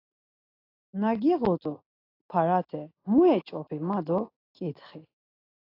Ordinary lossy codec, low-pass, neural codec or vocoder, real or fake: AAC, 24 kbps; 5.4 kHz; none; real